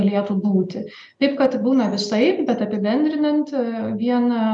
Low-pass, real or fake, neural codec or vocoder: 9.9 kHz; real; none